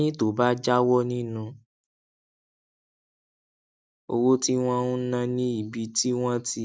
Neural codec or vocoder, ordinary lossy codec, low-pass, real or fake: none; none; none; real